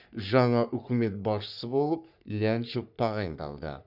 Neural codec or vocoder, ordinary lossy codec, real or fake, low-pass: codec, 44.1 kHz, 3.4 kbps, Pupu-Codec; none; fake; 5.4 kHz